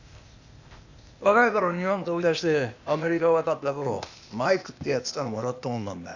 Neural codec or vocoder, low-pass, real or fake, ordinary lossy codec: codec, 16 kHz, 0.8 kbps, ZipCodec; 7.2 kHz; fake; none